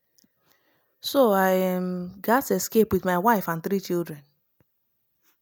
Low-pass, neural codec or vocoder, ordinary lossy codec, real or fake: none; none; none; real